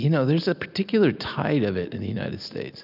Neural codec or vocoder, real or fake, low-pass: none; real; 5.4 kHz